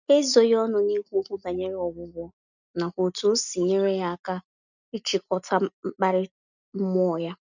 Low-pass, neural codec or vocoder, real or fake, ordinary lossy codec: 7.2 kHz; none; real; none